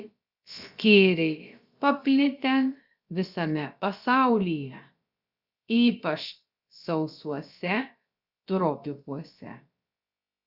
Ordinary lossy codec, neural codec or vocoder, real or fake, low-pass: Opus, 64 kbps; codec, 16 kHz, about 1 kbps, DyCAST, with the encoder's durations; fake; 5.4 kHz